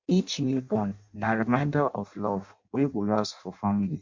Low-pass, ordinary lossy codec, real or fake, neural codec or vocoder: 7.2 kHz; MP3, 48 kbps; fake; codec, 16 kHz in and 24 kHz out, 0.6 kbps, FireRedTTS-2 codec